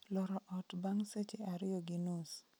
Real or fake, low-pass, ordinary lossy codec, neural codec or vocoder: real; none; none; none